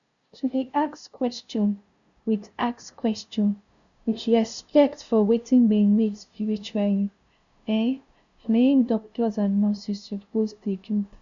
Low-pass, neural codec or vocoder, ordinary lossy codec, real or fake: 7.2 kHz; codec, 16 kHz, 0.5 kbps, FunCodec, trained on LibriTTS, 25 frames a second; none; fake